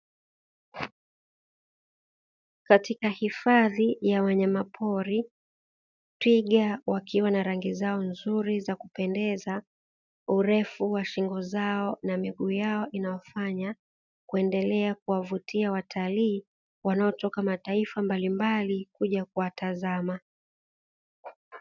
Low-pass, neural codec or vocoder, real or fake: 7.2 kHz; none; real